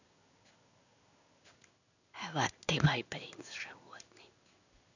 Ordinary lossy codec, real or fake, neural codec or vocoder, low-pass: none; fake; codec, 16 kHz in and 24 kHz out, 1 kbps, XY-Tokenizer; 7.2 kHz